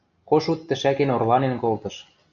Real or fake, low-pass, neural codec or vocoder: real; 7.2 kHz; none